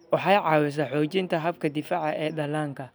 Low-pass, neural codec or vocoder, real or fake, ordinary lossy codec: none; none; real; none